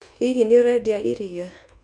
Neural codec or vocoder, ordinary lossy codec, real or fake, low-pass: codec, 24 kHz, 1.2 kbps, DualCodec; MP3, 64 kbps; fake; 10.8 kHz